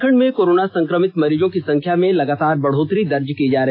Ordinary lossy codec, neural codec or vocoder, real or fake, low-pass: AAC, 32 kbps; none; real; 5.4 kHz